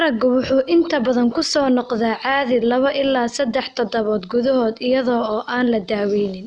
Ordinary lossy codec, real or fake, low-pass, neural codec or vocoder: none; real; 9.9 kHz; none